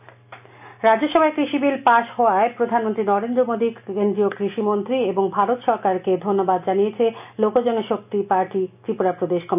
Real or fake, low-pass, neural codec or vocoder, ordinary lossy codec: real; 3.6 kHz; none; none